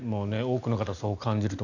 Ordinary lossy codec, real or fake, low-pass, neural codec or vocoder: none; real; 7.2 kHz; none